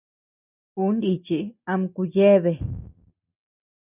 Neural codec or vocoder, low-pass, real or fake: none; 3.6 kHz; real